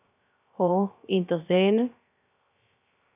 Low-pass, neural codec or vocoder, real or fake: 3.6 kHz; codec, 16 kHz, 0.3 kbps, FocalCodec; fake